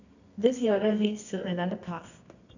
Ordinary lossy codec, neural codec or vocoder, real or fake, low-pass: none; codec, 24 kHz, 0.9 kbps, WavTokenizer, medium music audio release; fake; 7.2 kHz